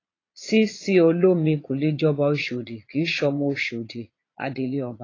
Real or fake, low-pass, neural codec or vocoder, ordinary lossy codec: fake; 7.2 kHz; vocoder, 22.05 kHz, 80 mel bands, Vocos; AAC, 32 kbps